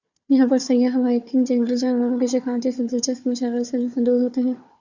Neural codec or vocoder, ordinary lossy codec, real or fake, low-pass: codec, 16 kHz, 4 kbps, FunCodec, trained on Chinese and English, 50 frames a second; Opus, 64 kbps; fake; 7.2 kHz